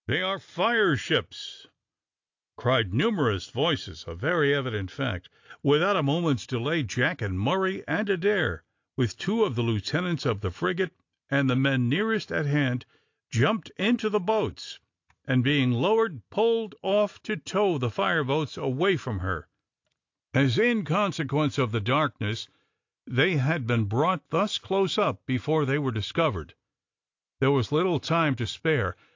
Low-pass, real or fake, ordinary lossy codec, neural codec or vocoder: 7.2 kHz; real; AAC, 48 kbps; none